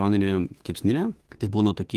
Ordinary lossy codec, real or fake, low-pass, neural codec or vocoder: Opus, 24 kbps; fake; 14.4 kHz; autoencoder, 48 kHz, 32 numbers a frame, DAC-VAE, trained on Japanese speech